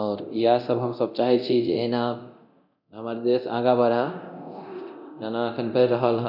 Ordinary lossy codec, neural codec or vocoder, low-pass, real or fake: none; codec, 24 kHz, 0.9 kbps, DualCodec; 5.4 kHz; fake